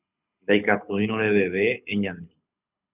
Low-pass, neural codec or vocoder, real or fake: 3.6 kHz; codec, 24 kHz, 6 kbps, HILCodec; fake